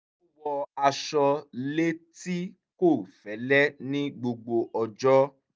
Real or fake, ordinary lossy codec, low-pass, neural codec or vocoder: real; none; none; none